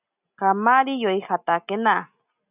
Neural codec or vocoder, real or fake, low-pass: none; real; 3.6 kHz